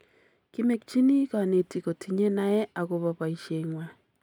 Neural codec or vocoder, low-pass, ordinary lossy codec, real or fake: none; 19.8 kHz; none; real